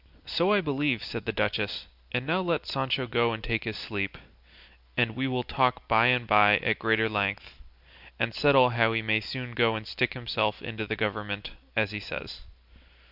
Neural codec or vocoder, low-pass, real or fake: none; 5.4 kHz; real